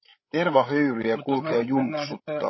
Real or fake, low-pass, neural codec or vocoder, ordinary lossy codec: fake; 7.2 kHz; codec, 16 kHz, 16 kbps, FreqCodec, larger model; MP3, 24 kbps